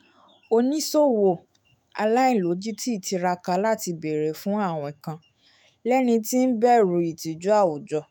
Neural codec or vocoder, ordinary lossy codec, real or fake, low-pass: autoencoder, 48 kHz, 128 numbers a frame, DAC-VAE, trained on Japanese speech; none; fake; none